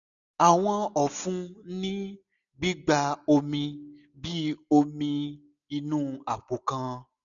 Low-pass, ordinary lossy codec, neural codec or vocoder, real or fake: 7.2 kHz; none; none; real